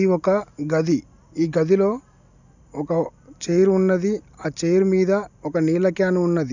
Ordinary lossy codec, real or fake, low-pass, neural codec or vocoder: none; real; 7.2 kHz; none